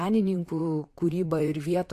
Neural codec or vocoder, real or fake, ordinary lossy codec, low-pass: vocoder, 44.1 kHz, 128 mel bands, Pupu-Vocoder; fake; AAC, 64 kbps; 14.4 kHz